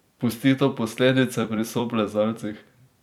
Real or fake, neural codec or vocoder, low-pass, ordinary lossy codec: fake; vocoder, 44.1 kHz, 128 mel bands every 256 samples, BigVGAN v2; 19.8 kHz; none